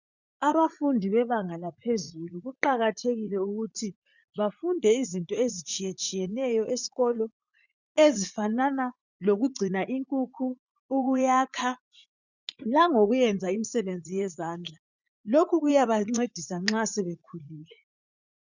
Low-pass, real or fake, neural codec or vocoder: 7.2 kHz; fake; vocoder, 44.1 kHz, 80 mel bands, Vocos